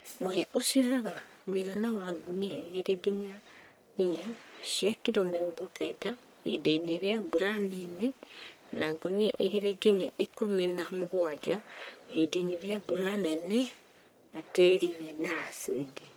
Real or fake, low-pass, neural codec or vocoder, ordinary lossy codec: fake; none; codec, 44.1 kHz, 1.7 kbps, Pupu-Codec; none